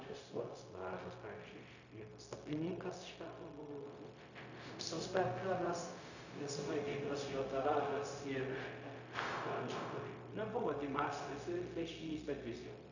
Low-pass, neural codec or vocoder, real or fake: 7.2 kHz; codec, 16 kHz, 0.4 kbps, LongCat-Audio-Codec; fake